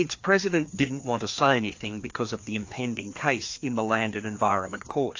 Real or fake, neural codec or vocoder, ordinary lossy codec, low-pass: fake; codec, 16 kHz, 2 kbps, FreqCodec, larger model; AAC, 48 kbps; 7.2 kHz